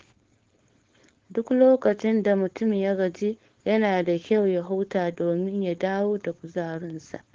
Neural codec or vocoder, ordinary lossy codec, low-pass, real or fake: codec, 16 kHz, 4.8 kbps, FACodec; Opus, 16 kbps; 7.2 kHz; fake